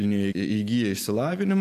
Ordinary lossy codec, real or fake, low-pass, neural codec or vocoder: AAC, 96 kbps; real; 14.4 kHz; none